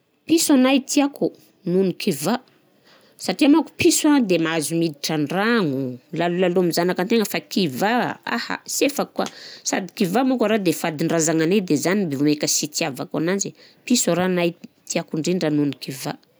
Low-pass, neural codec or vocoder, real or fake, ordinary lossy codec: none; none; real; none